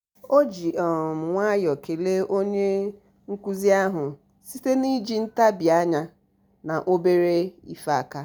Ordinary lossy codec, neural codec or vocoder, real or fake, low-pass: none; none; real; none